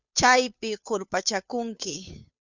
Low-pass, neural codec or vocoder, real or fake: 7.2 kHz; codec, 16 kHz, 2 kbps, FunCodec, trained on Chinese and English, 25 frames a second; fake